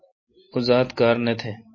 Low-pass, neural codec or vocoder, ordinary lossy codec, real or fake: 7.2 kHz; none; MP3, 32 kbps; real